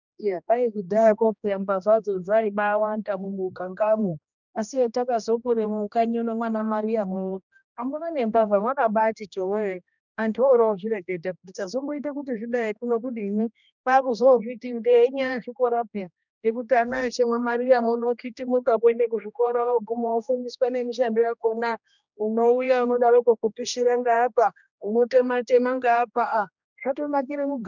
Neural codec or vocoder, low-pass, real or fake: codec, 16 kHz, 1 kbps, X-Codec, HuBERT features, trained on general audio; 7.2 kHz; fake